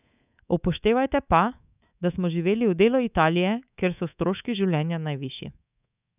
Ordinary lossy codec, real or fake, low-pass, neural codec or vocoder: none; fake; 3.6 kHz; codec, 24 kHz, 3.1 kbps, DualCodec